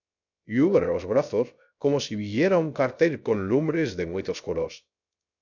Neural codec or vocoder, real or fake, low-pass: codec, 16 kHz, 0.3 kbps, FocalCodec; fake; 7.2 kHz